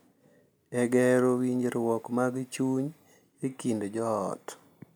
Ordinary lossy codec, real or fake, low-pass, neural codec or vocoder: none; real; none; none